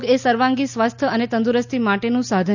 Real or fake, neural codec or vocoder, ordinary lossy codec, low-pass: real; none; none; 7.2 kHz